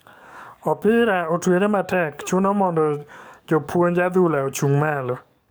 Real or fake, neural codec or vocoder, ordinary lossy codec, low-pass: fake; codec, 44.1 kHz, 7.8 kbps, DAC; none; none